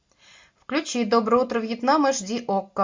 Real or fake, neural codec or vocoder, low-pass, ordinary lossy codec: real; none; 7.2 kHz; MP3, 48 kbps